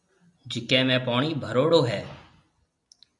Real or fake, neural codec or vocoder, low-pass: real; none; 10.8 kHz